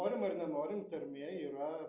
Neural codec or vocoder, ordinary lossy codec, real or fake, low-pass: none; Opus, 64 kbps; real; 3.6 kHz